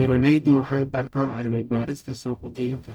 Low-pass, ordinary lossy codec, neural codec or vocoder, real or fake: 19.8 kHz; none; codec, 44.1 kHz, 0.9 kbps, DAC; fake